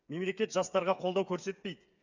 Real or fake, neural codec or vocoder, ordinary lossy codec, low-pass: fake; codec, 16 kHz, 8 kbps, FreqCodec, smaller model; none; 7.2 kHz